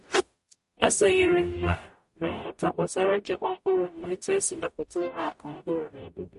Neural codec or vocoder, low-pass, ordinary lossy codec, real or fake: codec, 44.1 kHz, 0.9 kbps, DAC; 14.4 kHz; MP3, 48 kbps; fake